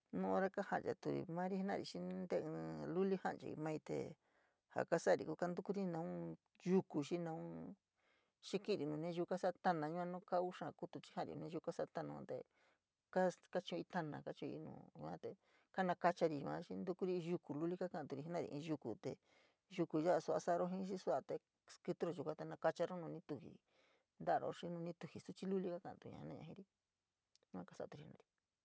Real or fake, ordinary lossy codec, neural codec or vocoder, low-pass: real; none; none; none